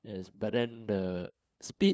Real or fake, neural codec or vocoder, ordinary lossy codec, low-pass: fake; codec, 16 kHz, 2 kbps, FunCodec, trained on LibriTTS, 25 frames a second; none; none